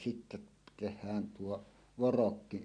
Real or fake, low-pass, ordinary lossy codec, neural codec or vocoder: real; 9.9 kHz; none; none